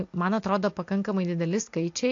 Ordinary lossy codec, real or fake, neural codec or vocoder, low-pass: AAC, 48 kbps; real; none; 7.2 kHz